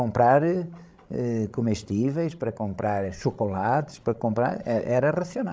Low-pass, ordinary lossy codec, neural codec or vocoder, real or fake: none; none; codec, 16 kHz, 16 kbps, FreqCodec, larger model; fake